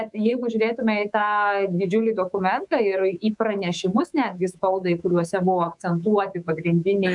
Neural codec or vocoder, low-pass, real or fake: codec, 24 kHz, 3.1 kbps, DualCodec; 10.8 kHz; fake